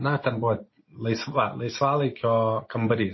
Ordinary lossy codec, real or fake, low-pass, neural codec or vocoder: MP3, 24 kbps; real; 7.2 kHz; none